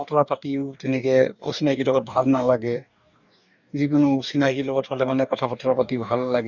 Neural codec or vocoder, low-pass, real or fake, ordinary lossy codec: codec, 44.1 kHz, 2.6 kbps, DAC; 7.2 kHz; fake; none